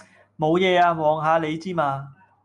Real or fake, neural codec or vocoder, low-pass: real; none; 10.8 kHz